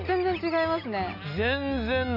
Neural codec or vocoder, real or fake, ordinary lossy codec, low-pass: none; real; none; 5.4 kHz